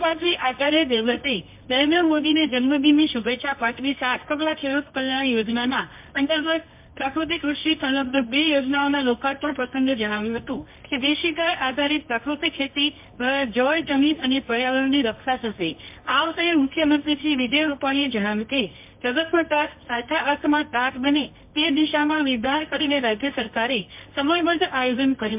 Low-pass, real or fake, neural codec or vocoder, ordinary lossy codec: 3.6 kHz; fake; codec, 24 kHz, 0.9 kbps, WavTokenizer, medium music audio release; MP3, 32 kbps